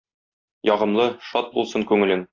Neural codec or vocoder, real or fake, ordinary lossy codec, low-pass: none; real; AAC, 32 kbps; 7.2 kHz